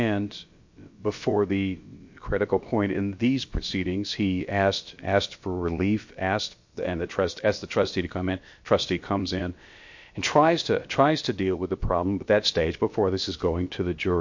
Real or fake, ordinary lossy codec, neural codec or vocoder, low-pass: fake; MP3, 48 kbps; codec, 16 kHz, about 1 kbps, DyCAST, with the encoder's durations; 7.2 kHz